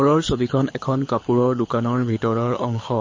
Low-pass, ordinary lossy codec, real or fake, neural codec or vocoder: 7.2 kHz; MP3, 32 kbps; fake; codec, 24 kHz, 6 kbps, HILCodec